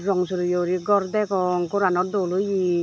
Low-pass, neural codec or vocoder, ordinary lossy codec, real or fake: none; none; none; real